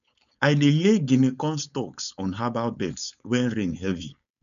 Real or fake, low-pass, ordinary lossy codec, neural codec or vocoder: fake; 7.2 kHz; none; codec, 16 kHz, 4.8 kbps, FACodec